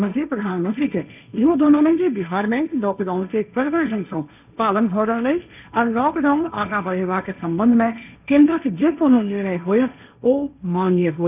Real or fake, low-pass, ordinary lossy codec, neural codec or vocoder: fake; 3.6 kHz; none; codec, 16 kHz, 1.1 kbps, Voila-Tokenizer